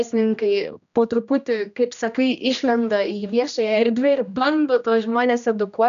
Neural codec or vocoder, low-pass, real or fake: codec, 16 kHz, 1 kbps, X-Codec, HuBERT features, trained on general audio; 7.2 kHz; fake